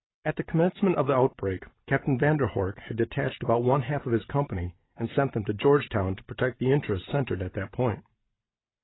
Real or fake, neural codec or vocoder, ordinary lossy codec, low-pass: real; none; AAC, 16 kbps; 7.2 kHz